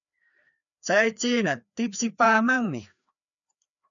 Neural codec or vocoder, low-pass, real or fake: codec, 16 kHz, 2 kbps, FreqCodec, larger model; 7.2 kHz; fake